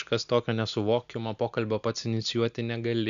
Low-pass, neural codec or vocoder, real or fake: 7.2 kHz; none; real